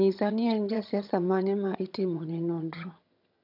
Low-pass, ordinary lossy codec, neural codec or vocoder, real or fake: 5.4 kHz; none; vocoder, 22.05 kHz, 80 mel bands, HiFi-GAN; fake